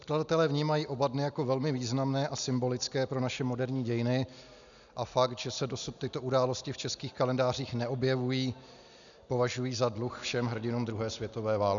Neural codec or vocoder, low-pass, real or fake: none; 7.2 kHz; real